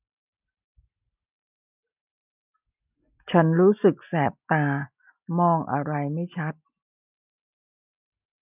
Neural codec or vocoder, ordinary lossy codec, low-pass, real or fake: none; none; 3.6 kHz; real